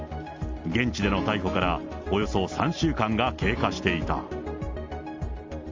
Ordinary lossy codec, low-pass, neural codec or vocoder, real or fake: Opus, 32 kbps; 7.2 kHz; none; real